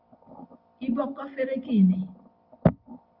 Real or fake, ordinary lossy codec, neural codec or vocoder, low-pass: real; Opus, 32 kbps; none; 5.4 kHz